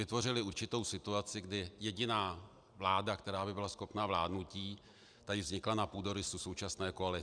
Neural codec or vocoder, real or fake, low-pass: none; real; 9.9 kHz